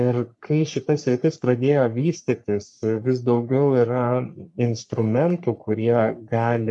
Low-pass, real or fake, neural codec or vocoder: 10.8 kHz; fake; codec, 44.1 kHz, 3.4 kbps, Pupu-Codec